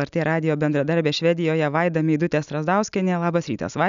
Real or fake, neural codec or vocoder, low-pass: real; none; 7.2 kHz